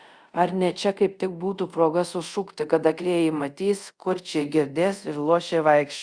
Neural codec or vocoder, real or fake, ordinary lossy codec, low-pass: codec, 24 kHz, 0.5 kbps, DualCodec; fake; Opus, 64 kbps; 9.9 kHz